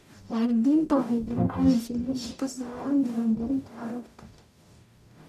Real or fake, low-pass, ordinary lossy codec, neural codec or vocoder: fake; 14.4 kHz; MP3, 96 kbps; codec, 44.1 kHz, 0.9 kbps, DAC